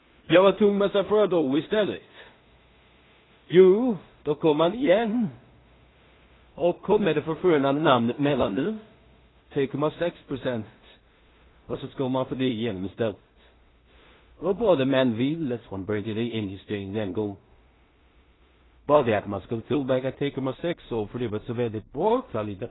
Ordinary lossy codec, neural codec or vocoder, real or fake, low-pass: AAC, 16 kbps; codec, 16 kHz in and 24 kHz out, 0.4 kbps, LongCat-Audio-Codec, two codebook decoder; fake; 7.2 kHz